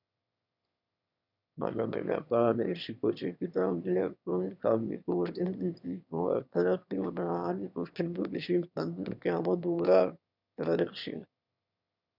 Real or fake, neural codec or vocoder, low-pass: fake; autoencoder, 22.05 kHz, a latent of 192 numbers a frame, VITS, trained on one speaker; 5.4 kHz